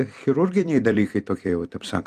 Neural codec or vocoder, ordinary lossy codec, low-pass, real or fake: vocoder, 44.1 kHz, 128 mel bands every 256 samples, BigVGAN v2; Opus, 32 kbps; 14.4 kHz; fake